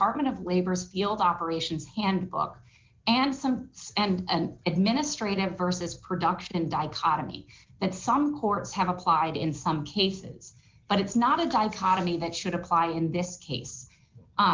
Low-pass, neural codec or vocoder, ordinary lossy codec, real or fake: 7.2 kHz; none; Opus, 32 kbps; real